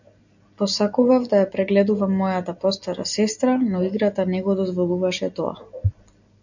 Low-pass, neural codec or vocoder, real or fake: 7.2 kHz; none; real